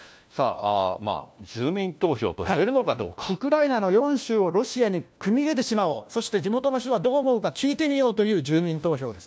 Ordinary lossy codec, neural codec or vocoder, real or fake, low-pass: none; codec, 16 kHz, 1 kbps, FunCodec, trained on LibriTTS, 50 frames a second; fake; none